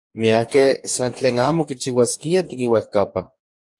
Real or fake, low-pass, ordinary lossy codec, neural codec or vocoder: fake; 10.8 kHz; AAC, 64 kbps; codec, 44.1 kHz, 2.6 kbps, DAC